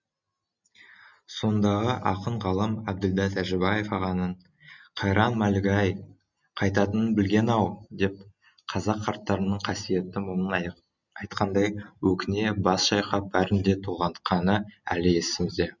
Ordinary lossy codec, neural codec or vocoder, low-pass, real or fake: none; none; 7.2 kHz; real